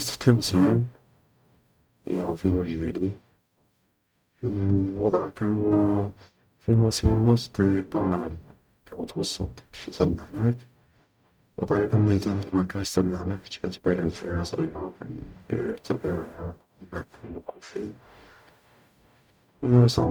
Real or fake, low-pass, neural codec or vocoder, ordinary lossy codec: fake; none; codec, 44.1 kHz, 0.9 kbps, DAC; none